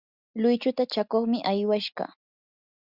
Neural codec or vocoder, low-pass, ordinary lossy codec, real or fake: none; 5.4 kHz; Opus, 64 kbps; real